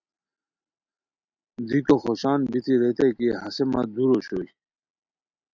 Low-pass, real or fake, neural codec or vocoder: 7.2 kHz; real; none